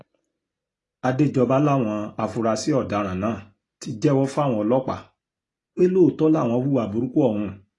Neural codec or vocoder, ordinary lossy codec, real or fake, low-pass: none; AAC, 32 kbps; real; 10.8 kHz